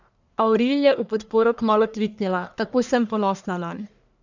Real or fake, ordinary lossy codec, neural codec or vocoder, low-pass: fake; none; codec, 44.1 kHz, 1.7 kbps, Pupu-Codec; 7.2 kHz